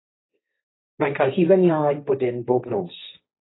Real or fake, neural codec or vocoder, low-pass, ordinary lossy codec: fake; codec, 16 kHz, 1.1 kbps, Voila-Tokenizer; 7.2 kHz; AAC, 16 kbps